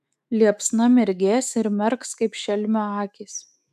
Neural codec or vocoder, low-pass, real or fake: autoencoder, 48 kHz, 128 numbers a frame, DAC-VAE, trained on Japanese speech; 14.4 kHz; fake